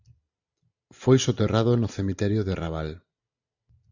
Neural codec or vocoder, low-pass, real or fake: none; 7.2 kHz; real